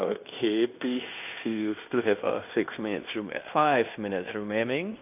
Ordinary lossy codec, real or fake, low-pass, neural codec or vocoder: AAC, 32 kbps; fake; 3.6 kHz; codec, 16 kHz in and 24 kHz out, 0.9 kbps, LongCat-Audio-Codec, fine tuned four codebook decoder